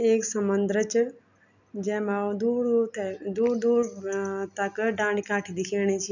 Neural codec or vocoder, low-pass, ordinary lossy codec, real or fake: none; 7.2 kHz; none; real